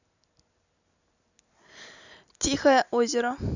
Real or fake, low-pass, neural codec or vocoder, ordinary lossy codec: real; 7.2 kHz; none; none